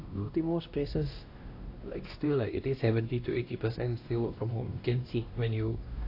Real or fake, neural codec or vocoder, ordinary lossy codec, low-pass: fake; codec, 16 kHz, 1 kbps, X-Codec, WavLM features, trained on Multilingual LibriSpeech; AAC, 32 kbps; 5.4 kHz